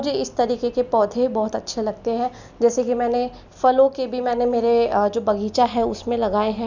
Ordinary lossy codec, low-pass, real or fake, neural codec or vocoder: none; 7.2 kHz; real; none